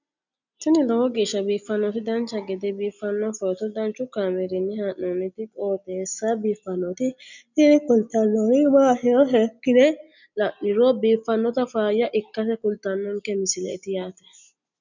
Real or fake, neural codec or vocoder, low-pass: real; none; 7.2 kHz